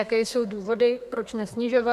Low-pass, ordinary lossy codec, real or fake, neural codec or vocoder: 14.4 kHz; AAC, 96 kbps; fake; codec, 32 kHz, 1.9 kbps, SNAC